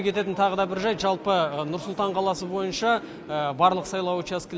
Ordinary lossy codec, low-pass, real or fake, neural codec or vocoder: none; none; real; none